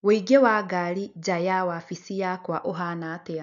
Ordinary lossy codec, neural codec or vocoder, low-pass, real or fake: none; none; 7.2 kHz; real